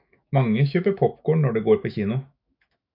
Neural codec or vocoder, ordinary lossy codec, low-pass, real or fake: autoencoder, 48 kHz, 128 numbers a frame, DAC-VAE, trained on Japanese speech; AAC, 48 kbps; 5.4 kHz; fake